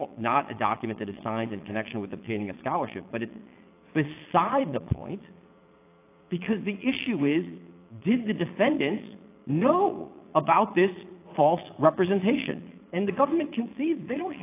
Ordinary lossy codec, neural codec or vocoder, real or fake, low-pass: AAC, 24 kbps; vocoder, 22.05 kHz, 80 mel bands, Vocos; fake; 3.6 kHz